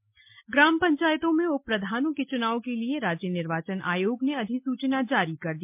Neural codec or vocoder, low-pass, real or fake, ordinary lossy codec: none; 3.6 kHz; real; MP3, 32 kbps